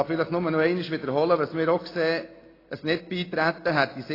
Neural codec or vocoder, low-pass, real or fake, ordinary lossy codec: none; 5.4 kHz; real; AAC, 24 kbps